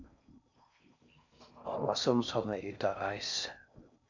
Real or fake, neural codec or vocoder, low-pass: fake; codec, 16 kHz in and 24 kHz out, 0.6 kbps, FocalCodec, streaming, 4096 codes; 7.2 kHz